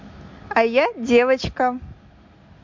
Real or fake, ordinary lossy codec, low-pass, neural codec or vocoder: real; MP3, 64 kbps; 7.2 kHz; none